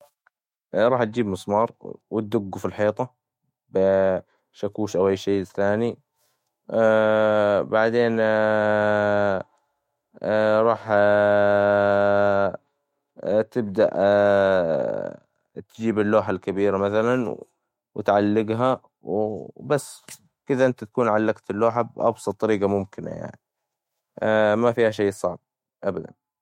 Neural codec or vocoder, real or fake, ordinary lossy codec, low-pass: autoencoder, 48 kHz, 128 numbers a frame, DAC-VAE, trained on Japanese speech; fake; MP3, 64 kbps; 19.8 kHz